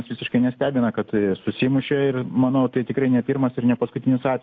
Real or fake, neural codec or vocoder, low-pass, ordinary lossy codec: real; none; 7.2 kHz; AAC, 48 kbps